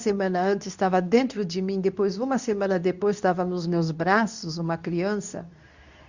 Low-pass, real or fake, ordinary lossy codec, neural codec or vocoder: 7.2 kHz; fake; Opus, 64 kbps; codec, 24 kHz, 0.9 kbps, WavTokenizer, medium speech release version 2